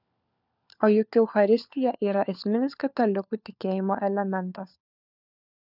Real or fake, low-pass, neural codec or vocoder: fake; 5.4 kHz; codec, 16 kHz, 4 kbps, FunCodec, trained on LibriTTS, 50 frames a second